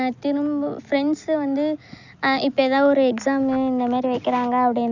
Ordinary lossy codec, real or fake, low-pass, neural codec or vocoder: none; real; 7.2 kHz; none